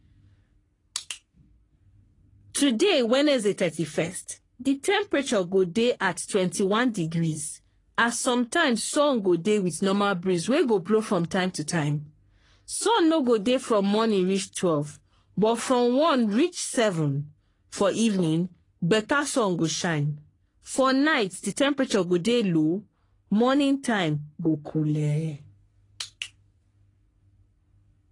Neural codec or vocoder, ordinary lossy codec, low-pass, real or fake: codec, 44.1 kHz, 3.4 kbps, Pupu-Codec; AAC, 32 kbps; 10.8 kHz; fake